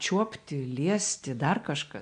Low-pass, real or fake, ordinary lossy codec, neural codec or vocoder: 9.9 kHz; real; AAC, 64 kbps; none